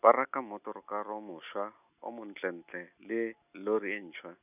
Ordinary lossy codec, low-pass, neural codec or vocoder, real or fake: none; 3.6 kHz; none; real